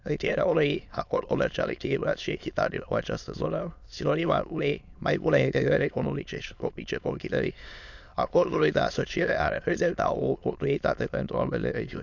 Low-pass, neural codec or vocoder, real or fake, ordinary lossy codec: 7.2 kHz; autoencoder, 22.05 kHz, a latent of 192 numbers a frame, VITS, trained on many speakers; fake; none